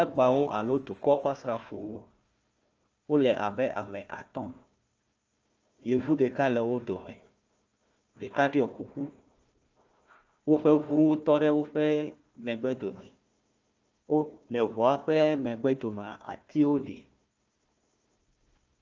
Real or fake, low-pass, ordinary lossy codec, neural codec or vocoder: fake; 7.2 kHz; Opus, 24 kbps; codec, 16 kHz, 1 kbps, FunCodec, trained on Chinese and English, 50 frames a second